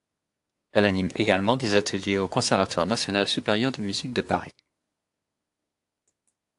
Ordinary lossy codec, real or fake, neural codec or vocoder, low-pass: AAC, 64 kbps; fake; codec, 24 kHz, 1 kbps, SNAC; 10.8 kHz